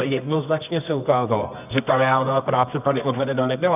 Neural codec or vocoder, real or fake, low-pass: codec, 24 kHz, 0.9 kbps, WavTokenizer, medium music audio release; fake; 3.6 kHz